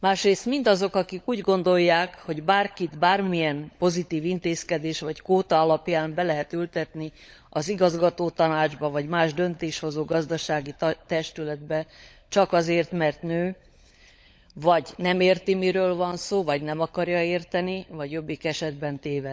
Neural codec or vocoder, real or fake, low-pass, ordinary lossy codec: codec, 16 kHz, 16 kbps, FunCodec, trained on LibriTTS, 50 frames a second; fake; none; none